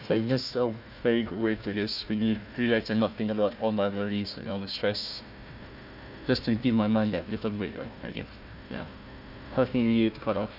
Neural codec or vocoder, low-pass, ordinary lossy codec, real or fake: codec, 16 kHz, 1 kbps, FunCodec, trained on Chinese and English, 50 frames a second; 5.4 kHz; none; fake